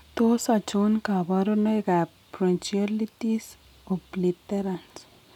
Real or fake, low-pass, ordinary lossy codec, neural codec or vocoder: real; 19.8 kHz; none; none